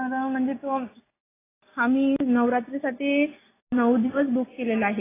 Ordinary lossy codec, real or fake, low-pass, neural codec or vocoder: AAC, 16 kbps; real; 3.6 kHz; none